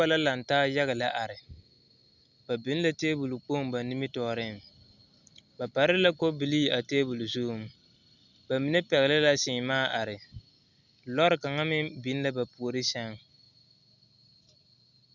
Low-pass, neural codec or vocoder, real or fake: 7.2 kHz; none; real